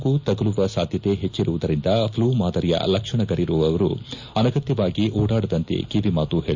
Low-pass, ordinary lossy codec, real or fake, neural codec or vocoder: 7.2 kHz; MP3, 64 kbps; real; none